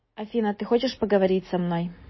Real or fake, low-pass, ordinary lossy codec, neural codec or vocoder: real; 7.2 kHz; MP3, 24 kbps; none